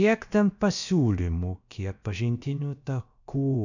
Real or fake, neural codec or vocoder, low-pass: fake; codec, 16 kHz, about 1 kbps, DyCAST, with the encoder's durations; 7.2 kHz